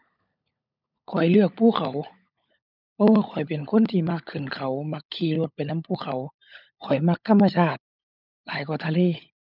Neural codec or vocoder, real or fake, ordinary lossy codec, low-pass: codec, 16 kHz, 16 kbps, FunCodec, trained on LibriTTS, 50 frames a second; fake; none; 5.4 kHz